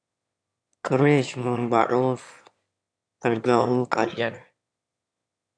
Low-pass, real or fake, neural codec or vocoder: 9.9 kHz; fake; autoencoder, 22.05 kHz, a latent of 192 numbers a frame, VITS, trained on one speaker